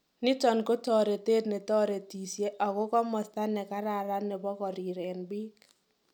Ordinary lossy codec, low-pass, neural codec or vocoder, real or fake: none; 19.8 kHz; none; real